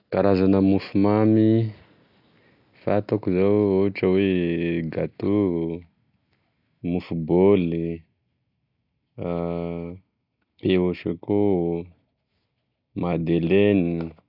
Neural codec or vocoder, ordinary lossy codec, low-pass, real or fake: none; none; 5.4 kHz; real